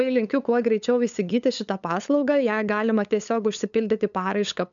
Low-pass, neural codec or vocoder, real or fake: 7.2 kHz; codec, 16 kHz, 8 kbps, FunCodec, trained on LibriTTS, 25 frames a second; fake